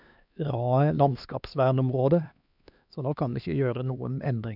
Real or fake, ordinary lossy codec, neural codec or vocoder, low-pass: fake; none; codec, 16 kHz, 2 kbps, X-Codec, HuBERT features, trained on LibriSpeech; 5.4 kHz